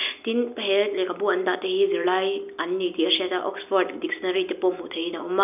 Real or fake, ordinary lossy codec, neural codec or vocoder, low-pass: real; none; none; 3.6 kHz